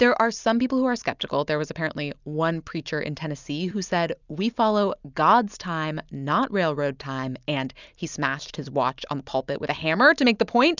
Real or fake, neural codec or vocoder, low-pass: real; none; 7.2 kHz